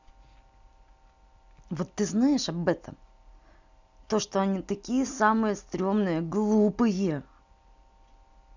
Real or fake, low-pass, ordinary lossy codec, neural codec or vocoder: real; 7.2 kHz; none; none